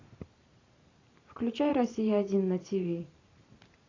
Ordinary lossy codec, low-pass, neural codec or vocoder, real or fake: AAC, 32 kbps; 7.2 kHz; vocoder, 44.1 kHz, 128 mel bands every 512 samples, BigVGAN v2; fake